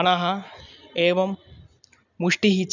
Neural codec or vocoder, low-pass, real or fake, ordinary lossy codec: none; 7.2 kHz; real; none